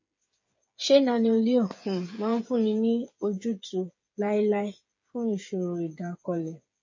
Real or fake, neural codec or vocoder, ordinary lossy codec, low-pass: fake; codec, 16 kHz, 8 kbps, FreqCodec, smaller model; MP3, 32 kbps; 7.2 kHz